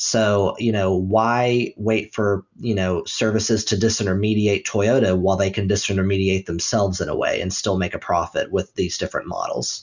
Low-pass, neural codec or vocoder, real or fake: 7.2 kHz; none; real